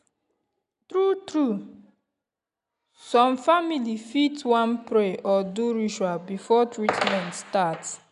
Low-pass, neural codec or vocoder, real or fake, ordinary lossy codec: 10.8 kHz; none; real; none